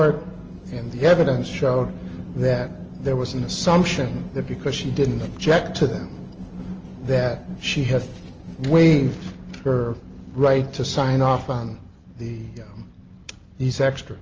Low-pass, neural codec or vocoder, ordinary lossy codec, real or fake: 7.2 kHz; none; Opus, 24 kbps; real